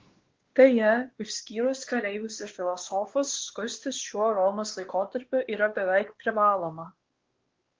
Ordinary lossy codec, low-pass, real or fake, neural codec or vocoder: Opus, 16 kbps; 7.2 kHz; fake; codec, 16 kHz, 2 kbps, X-Codec, WavLM features, trained on Multilingual LibriSpeech